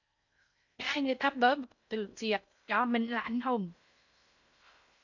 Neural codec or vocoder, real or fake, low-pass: codec, 16 kHz in and 24 kHz out, 0.6 kbps, FocalCodec, streaming, 4096 codes; fake; 7.2 kHz